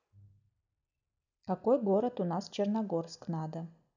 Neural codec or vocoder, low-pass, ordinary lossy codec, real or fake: none; 7.2 kHz; none; real